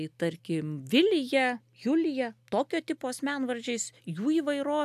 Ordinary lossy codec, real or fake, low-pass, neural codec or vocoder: AAC, 96 kbps; real; 14.4 kHz; none